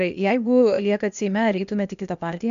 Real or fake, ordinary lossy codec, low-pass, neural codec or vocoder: fake; MP3, 64 kbps; 7.2 kHz; codec, 16 kHz, 0.8 kbps, ZipCodec